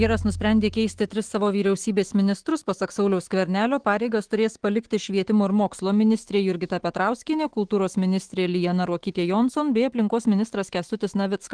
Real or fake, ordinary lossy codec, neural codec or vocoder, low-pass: fake; Opus, 16 kbps; autoencoder, 48 kHz, 128 numbers a frame, DAC-VAE, trained on Japanese speech; 9.9 kHz